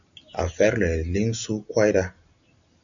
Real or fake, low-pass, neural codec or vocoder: real; 7.2 kHz; none